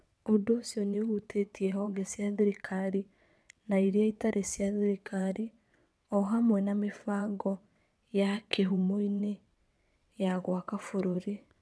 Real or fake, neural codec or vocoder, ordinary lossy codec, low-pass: fake; vocoder, 22.05 kHz, 80 mel bands, WaveNeXt; none; none